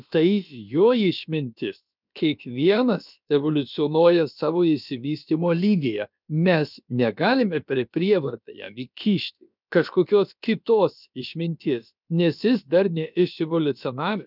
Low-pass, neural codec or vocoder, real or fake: 5.4 kHz; codec, 16 kHz, about 1 kbps, DyCAST, with the encoder's durations; fake